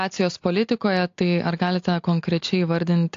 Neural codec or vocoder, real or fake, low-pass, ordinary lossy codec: none; real; 7.2 kHz; AAC, 48 kbps